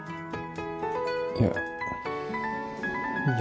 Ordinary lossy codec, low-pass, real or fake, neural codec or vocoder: none; none; real; none